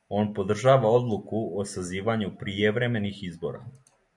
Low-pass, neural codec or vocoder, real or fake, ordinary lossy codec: 10.8 kHz; none; real; MP3, 96 kbps